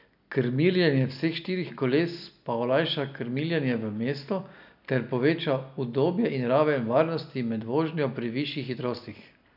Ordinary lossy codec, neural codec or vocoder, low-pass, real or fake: none; none; 5.4 kHz; real